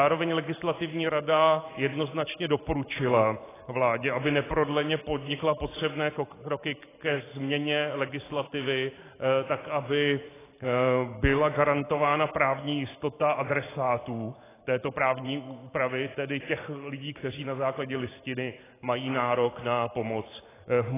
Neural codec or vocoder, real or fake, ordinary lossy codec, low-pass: vocoder, 44.1 kHz, 128 mel bands every 512 samples, BigVGAN v2; fake; AAC, 16 kbps; 3.6 kHz